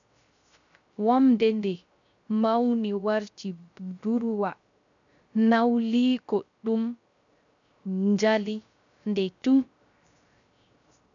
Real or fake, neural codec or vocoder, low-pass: fake; codec, 16 kHz, 0.3 kbps, FocalCodec; 7.2 kHz